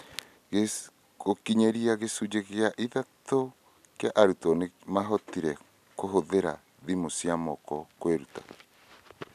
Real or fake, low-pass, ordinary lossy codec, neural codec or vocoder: real; 14.4 kHz; none; none